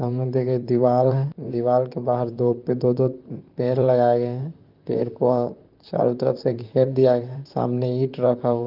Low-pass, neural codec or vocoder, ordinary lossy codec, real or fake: 5.4 kHz; vocoder, 44.1 kHz, 128 mel bands, Pupu-Vocoder; Opus, 24 kbps; fake